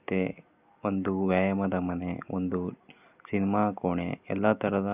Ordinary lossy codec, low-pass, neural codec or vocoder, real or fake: none; 3.6 kHz; none; real